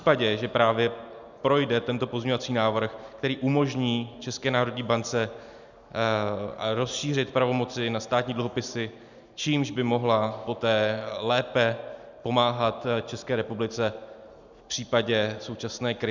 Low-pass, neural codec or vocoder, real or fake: 7.2 kHz; none; real